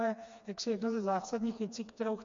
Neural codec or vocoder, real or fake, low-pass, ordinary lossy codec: codec, 16 kHz, 2 kbps, FreqCodec, smaller model; fake; 7.2 kHz; MP3, 48 kbps